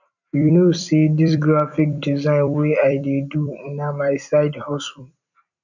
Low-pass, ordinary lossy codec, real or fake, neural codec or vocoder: 7.2 kHz; none; fake; vocoder, 44.1 kHz, 128 mel bands every 256 samples, BigVGAN v2